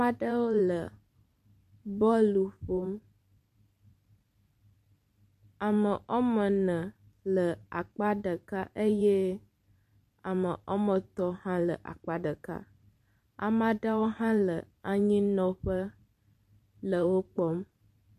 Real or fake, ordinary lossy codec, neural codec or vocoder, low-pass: fake; MP3, 64 kbps; vocoder, 44.1 kHz, 128 mel bands every 512 samples, BigVGAN v2; 14.4 kHz